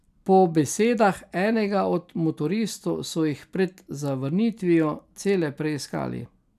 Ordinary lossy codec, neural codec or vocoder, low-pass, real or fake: none; none; 14.4 kHz; real